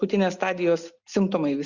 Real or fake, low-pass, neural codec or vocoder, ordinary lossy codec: real; 7.2 kHz; none; Opus, 64 kbps